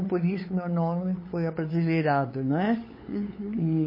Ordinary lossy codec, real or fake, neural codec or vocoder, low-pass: MP3, 24 kbps; fake; codec, 16 kHz, 8 kbps, FunCodec, trained on LibriTTS, 25 frames a second; 5.4 kHz